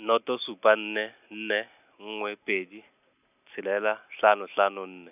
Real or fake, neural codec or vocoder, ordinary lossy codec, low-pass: real; none; none; 3.6 kHz